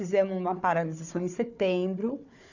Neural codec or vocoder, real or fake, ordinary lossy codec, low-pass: codec, 16 kHz, 4 kbps, FunCodec, trained on Chinese and English, 50 frames a second; fake; none; 7.2 kHz